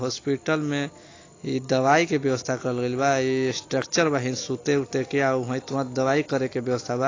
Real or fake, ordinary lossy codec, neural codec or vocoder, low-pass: real; AAC, 32 kbps; none; 7.2 kHz